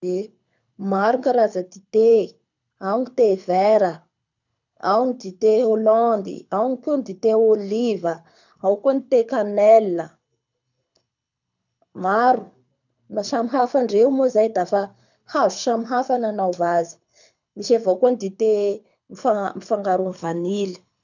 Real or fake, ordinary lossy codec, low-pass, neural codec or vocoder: fake; none; 7.2 kHz; codec, 24 kHz, 6 kbps, HILCodec